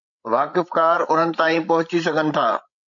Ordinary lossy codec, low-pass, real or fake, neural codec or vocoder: MP3, 48 kbps; 7.2 kHz; fake; codec, 16 kHz, 16 kbps, FreqCodec, larger model